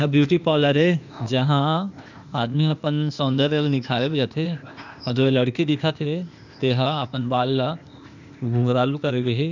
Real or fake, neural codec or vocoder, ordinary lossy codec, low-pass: fake; codec, 16 kHz, 0.8 kbps, ZipCodec; none; 7.2 kHz